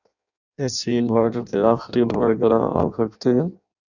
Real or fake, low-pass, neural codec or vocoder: fake; 7.2 kHz; codec, 16 kHz in and 24 kHz out, 0.6 kbps, FireRedTTS-2 codec